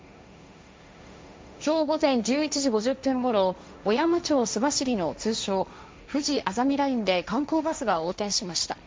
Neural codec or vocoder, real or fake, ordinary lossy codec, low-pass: codec, 16 kHz, 1.1 kbps, Voila-Tokenizer; fake; none; none